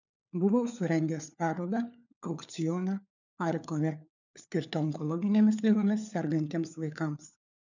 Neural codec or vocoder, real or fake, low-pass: codec, 16 kHz, 4 kbps, FunCodec, trained on LibriTTS, 50 frames a second; fake; 7.2 kHz